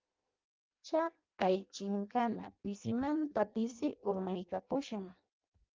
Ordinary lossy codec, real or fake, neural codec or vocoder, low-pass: Opus, 32 kbps; fake; codec, 16 kHz in and 24 kHz out, 0.6 kbps, FireRedTTS-2 codec; 7.2 kHz